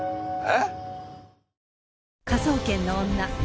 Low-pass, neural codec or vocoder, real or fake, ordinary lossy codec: none; none; real; none